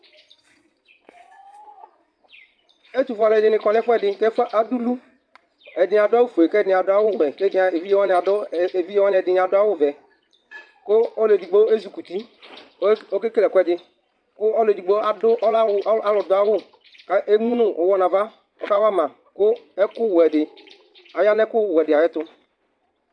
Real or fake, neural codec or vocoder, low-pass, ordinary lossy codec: fake; vocoder, 22.05 kHz, 80 mel bands, Vocos; 9.9 kHz; AAC, 64 kbps